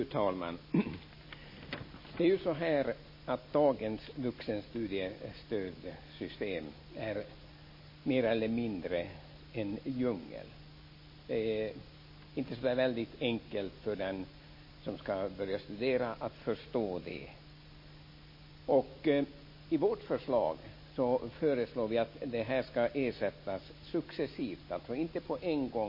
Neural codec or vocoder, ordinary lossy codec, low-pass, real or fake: none; MP3, 24 kbps; 5.4 kHz; real